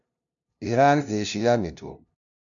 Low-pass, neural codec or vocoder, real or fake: 7.2 kHz; codec, 16 kHz, 0.5 kbps, FunCodec, trained on LibriTTS, 25 frames a second; fake